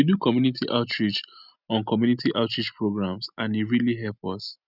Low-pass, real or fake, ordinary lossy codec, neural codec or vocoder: 5.4 kHz; real; none; none